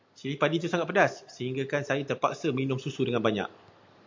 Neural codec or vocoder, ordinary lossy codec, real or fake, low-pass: none; MP3, 64 kbps; real; 7.2 kHz